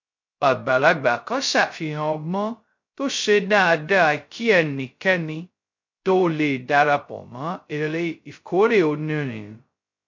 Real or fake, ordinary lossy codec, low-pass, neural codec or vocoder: fake; MP3, 48 kbps; 7.2 kHz; codec, 16 kHz, 0.2 kbps, FocalCodec